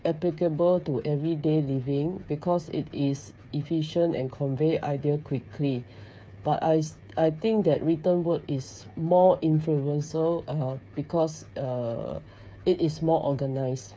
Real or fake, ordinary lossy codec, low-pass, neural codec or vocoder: fake; none; none; codec, 16 kHz, 8 kbps, FreqCodec, smaller model